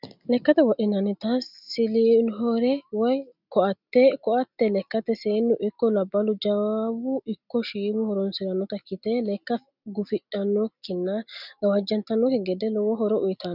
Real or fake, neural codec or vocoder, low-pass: real; none; 5.4 kHz